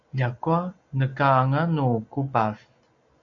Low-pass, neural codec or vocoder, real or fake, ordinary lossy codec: 7.2 kHz; none; real; MP3, 96 kbps